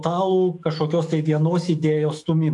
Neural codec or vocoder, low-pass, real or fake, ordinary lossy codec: autoencoder, 48 kHz, 128 numbers a frame, DAC-VAE, trained on Japanese speech; 10.8 kHz; fake; AAC, 64 kbps